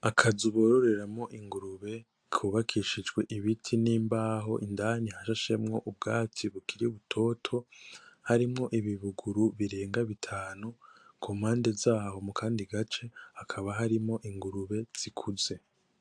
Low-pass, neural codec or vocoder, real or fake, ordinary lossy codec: 9.9 kHz; none; real; AAC, 64 kbps